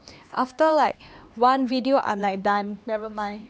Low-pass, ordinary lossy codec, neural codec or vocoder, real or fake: none; none; codec, 16 kHz, 2 kbps, X-Codec, HuBERT features, trained on LibriSpeech; fake